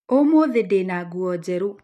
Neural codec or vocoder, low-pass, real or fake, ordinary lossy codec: none; 14.4 kHz; real; none